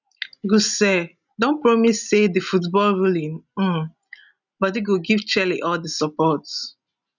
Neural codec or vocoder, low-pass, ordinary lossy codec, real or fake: none; 7.2 kHz; none; real